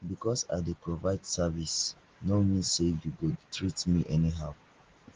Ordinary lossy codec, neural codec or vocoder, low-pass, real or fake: Opus, 16 kbps; none; 7.2 kHz; real